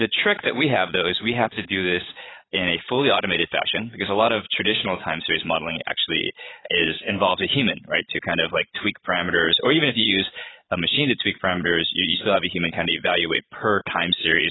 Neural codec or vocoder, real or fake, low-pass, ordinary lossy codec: vocoder, 22.05 kHz, 80 mel bands, Vocos; fake; 7.2 kHz; AAC, 16 kbps